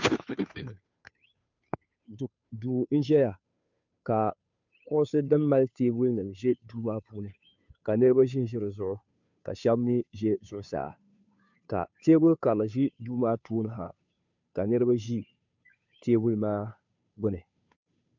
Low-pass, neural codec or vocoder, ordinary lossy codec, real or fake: 7.2 kHz; codec, 16 kHz, 2 kbps, FunCodec, trained on Chinese and English, 25 frames a second; MP3, 64 kbps; fake